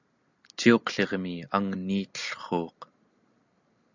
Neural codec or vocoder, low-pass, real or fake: none; 7.2 kHz; real